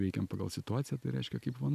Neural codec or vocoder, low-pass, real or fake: none; 14.4 kHz; real